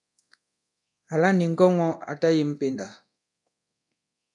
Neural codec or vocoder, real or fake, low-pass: codec, 24 kHz, 0.9 kbps, DualCodec; fake; 10.8 kHz